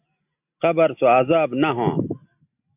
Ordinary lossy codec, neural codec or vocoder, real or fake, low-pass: AAC, 32 kbps; none; real; 3.6 kHz